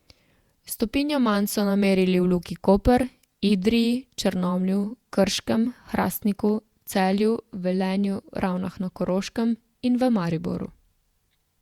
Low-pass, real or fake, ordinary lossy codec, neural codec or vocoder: 19.8 kHz; fake; Opus, 64 kbps; vocoder, 48 kHz, 128 mel bands, Vocos